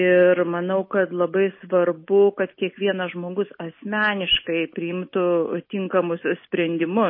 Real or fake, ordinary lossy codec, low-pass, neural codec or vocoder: real; MP3, 24 kbps; 5.4 kHz; none